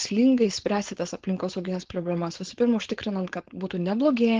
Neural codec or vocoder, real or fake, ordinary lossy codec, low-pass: codec, 16 kHz, 4.8 kbps, FACodec; fake; Opus, 16 kbps; 7.2 kHz